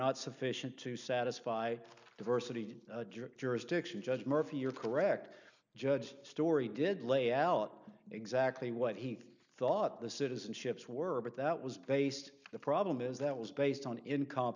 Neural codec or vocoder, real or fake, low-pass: none; real; 7.2 kHz